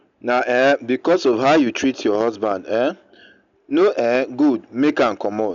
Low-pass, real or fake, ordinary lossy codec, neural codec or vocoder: 7.2 kHz; real; none; none